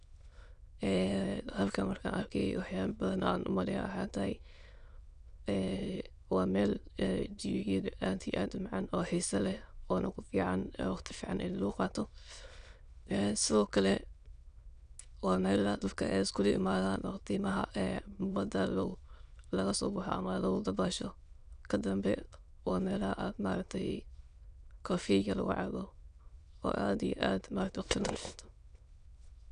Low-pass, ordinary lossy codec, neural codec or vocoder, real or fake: 9.9 kHz; none; autoencoder, 22.05 kHz, a latent of 192 numbers a frame, VITS, trained on many speakers; fake